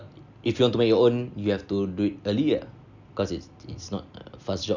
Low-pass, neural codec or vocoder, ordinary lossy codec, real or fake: 7.2 kHz; none; none; real